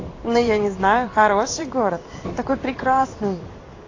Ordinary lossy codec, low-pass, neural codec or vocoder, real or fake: AAC, 32 kbps; 7.2 kHz; codec, 16 kHz in and 24 kHz out, 1 kbps, XY-Tokenizer; fake